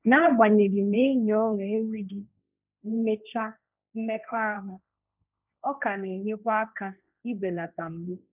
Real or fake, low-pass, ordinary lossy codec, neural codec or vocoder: fake; 3.6 kHz; none; codec, 16 kHz, 1.1 kbps, Voila-Tokenizer